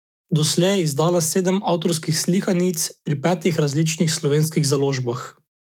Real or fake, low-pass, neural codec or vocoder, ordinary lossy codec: fake; 19.8 kHz; codec, 44.1 kHz, 7.8 kbps, DAC; none